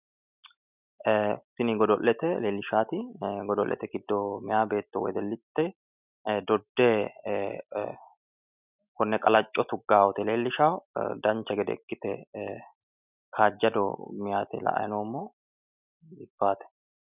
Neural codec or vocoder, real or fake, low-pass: none; real; 3.6 kHz